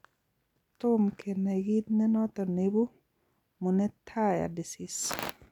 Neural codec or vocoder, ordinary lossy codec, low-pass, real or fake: none; none; 19.8 kHz; real